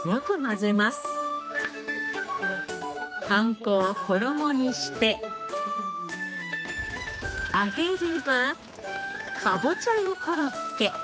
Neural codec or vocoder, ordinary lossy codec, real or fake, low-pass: codec, 16 kHz, 2 kbps, X-Codec, HuBERT features, trained on general audio; none; fake; none